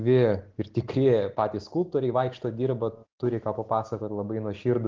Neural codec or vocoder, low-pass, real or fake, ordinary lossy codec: none; 7.2 kHz; real; Opus, 16 kbps